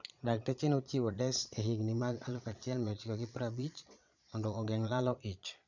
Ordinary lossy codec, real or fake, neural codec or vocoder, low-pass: none; fake; vocoder, 22.05 kHz, 80 mel bands, Vocos; 7.2 kHz